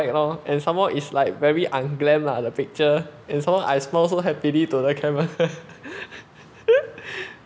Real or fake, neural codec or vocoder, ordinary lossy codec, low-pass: real; none; none; none